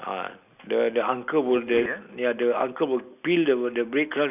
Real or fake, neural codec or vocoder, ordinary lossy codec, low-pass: real; none; none; 3.6 kHz